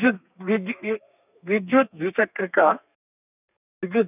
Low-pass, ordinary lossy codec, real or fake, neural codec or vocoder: 3.6 kHz; none; fake; codec, 32 kHz, 1.9 kbps, SNAC